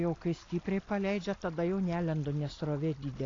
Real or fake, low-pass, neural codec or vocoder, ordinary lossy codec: real; 7.2 kHz; none; AAC, 32 kbps